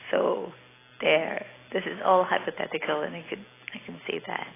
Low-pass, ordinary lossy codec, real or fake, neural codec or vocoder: 3.6 kHz; AAC, 16 kbps; real; none